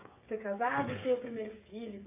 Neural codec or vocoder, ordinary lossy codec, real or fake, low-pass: codec, 16 kHz, 16 kbps, FreqCodec, smaller model; AAC, 24 kbps; fake; 3.6 kHz